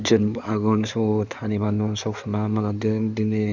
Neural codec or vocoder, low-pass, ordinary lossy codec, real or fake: codec, 16 kHz in and 24 kHz out, 2.2 kbps, FireRedTTS-2 codec; 7.2 kHz; none; fake